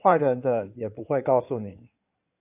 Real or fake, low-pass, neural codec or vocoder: fake; 3.6 kHz; codec, 16 kHz in and 24 kHz out, 2.2 kbps, FireRedTTS-2 codec